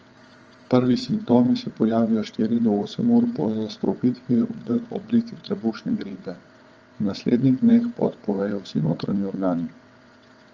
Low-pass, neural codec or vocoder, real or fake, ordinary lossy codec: 7.2 kHz; vocoder, 22.05 kHz, 80 mel bands, WaveNeXt; fake; Opus, 24 kbps